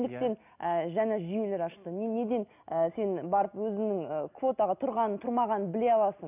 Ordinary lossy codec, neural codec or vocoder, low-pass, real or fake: none; none; 3.6 kHz; real